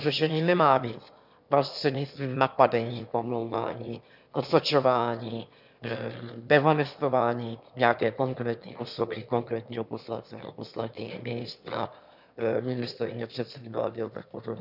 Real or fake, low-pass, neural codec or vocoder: fake; 5.4 kHz; autoencoder, 22.05 kHz, a latent of 192 numbers a frame, VITS, trained on one speaker